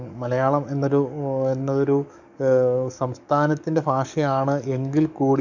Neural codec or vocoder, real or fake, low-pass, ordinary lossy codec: codec, 44.1 kHz, 7.8 kbps, DAC; fake; 7.2 kHz; none